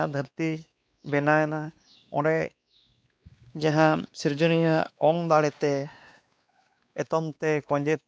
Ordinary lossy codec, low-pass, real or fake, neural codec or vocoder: none; none; fake; codec, 16 kHz, 2 kbps, X-Codec, WavLM features, trained on Multilingual LibriSpeech